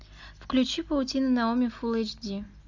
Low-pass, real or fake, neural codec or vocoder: 7.2 kHz; real; none